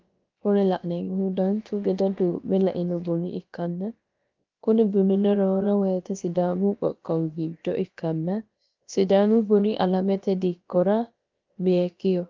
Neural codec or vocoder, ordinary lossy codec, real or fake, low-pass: codec, 16 kHz, about 1 kbps, DyCAST, with the encoder's durations; Opus, 24 kbps; fake; 7.2 kHz